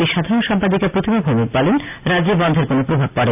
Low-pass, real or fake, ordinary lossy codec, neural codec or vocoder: 3.6 kHz; real; none; none